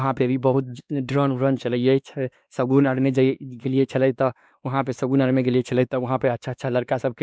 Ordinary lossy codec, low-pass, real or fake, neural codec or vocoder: none; none; fake; codec, 16 kHz, 2 kbps, X-Codec, WavLM features, trained on Multilingual LibriSpeech